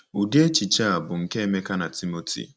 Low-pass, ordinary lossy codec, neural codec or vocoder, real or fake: none; none; none; real